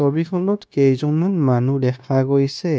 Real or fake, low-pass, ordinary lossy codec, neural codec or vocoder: fake; none; none; codec, 16 kHz, 0.9 kbps, LongCat-Audio-Codec